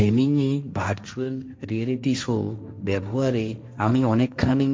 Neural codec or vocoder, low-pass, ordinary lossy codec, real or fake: codec, 16 kHz, 1.1 kbps, Voila-Tokenizer; none; none; fake